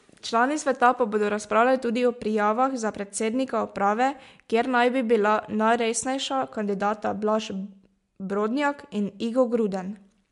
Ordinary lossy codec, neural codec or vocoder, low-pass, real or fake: MP3, 64 kbps; none; 10.8 kHz; real